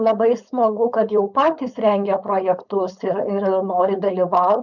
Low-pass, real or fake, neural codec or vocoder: 7.2 kHz; fake; codec, 16 kHz, 4.8 kbps, FACodec